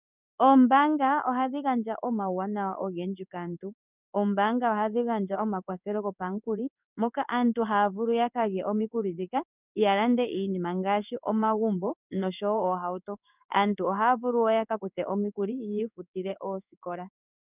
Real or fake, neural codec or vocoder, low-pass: fake; codec, 16 kHz in and 24 kHz out, 1 kbps, XY-Tokenizer; 3.6 kHz